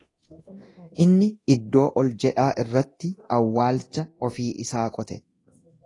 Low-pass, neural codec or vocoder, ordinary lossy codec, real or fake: 10.8 kHz; codec, 24 kHz, 0.9 kbps, DualCodec; AAC, 32 kbps; fake